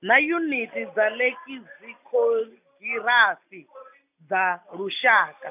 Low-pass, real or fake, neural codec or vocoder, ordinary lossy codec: 3.6 kHz; real; none; none